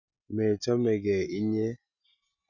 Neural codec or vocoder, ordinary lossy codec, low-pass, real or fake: none; none; 7.2 kHz; real